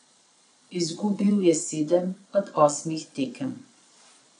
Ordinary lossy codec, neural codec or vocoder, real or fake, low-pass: none; vocoder, 22.05 kHz, 80 mel bands, Vocos; fake; 9.9 kHz